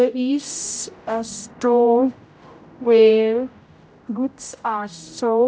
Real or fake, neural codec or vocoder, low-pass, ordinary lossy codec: fake; codec, 16 kHz, 0.5 kbps, X-Codec, HuBERT features, trained on general audio; none; none